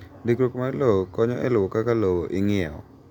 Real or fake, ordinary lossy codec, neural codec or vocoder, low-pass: real; none; none; 19.8 kHz